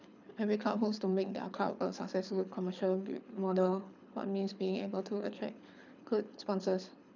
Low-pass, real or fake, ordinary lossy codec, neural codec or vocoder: 7.2 kHz; fake; none; codec, 24 kHz, 6 kbps, HILCodec